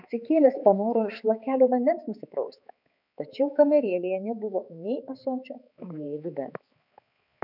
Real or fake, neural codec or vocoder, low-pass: fake; codec, 16 kHz, 4 kbps, X-Codec, HuBERT features, trained on balanced general audio; 5.4 kHz